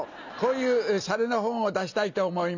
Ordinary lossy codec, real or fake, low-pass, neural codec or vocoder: none; real; 7.2 kHz; none